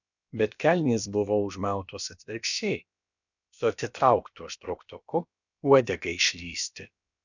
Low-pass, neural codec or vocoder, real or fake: 7.2 kHz; codec, 16 kHz, about 1 kbps, DyCAST, with the encoder's durations; fake